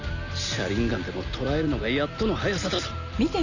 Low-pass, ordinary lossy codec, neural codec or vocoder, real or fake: 7.2 kHz; none; none; real